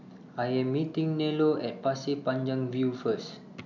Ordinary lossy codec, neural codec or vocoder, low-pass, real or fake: none; none; 7.2 kHz; real